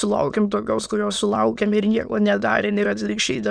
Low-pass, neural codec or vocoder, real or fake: 9.9 kHz; autoencoder, 22.05 kHz, a latent of 192 numbers a frame, VITS, trained on many speakers; fake